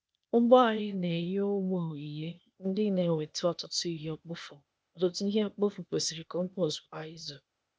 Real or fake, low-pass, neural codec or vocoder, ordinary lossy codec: fake; none; codec, 16 kHz, 0.8 kbps, ZipCodec; none